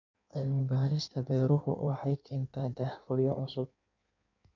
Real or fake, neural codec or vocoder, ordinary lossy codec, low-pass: fake; codec, 16 kHz in and 24 kHz out, 1.1 kbps, FireRedTTS-2 codec; none; 7.2 kHz